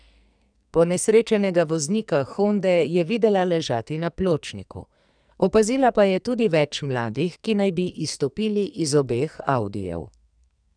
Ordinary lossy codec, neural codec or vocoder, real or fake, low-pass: none; codec, 44.1 kHz, 2.6 kbps, SNAC; fake; 9.9 kHz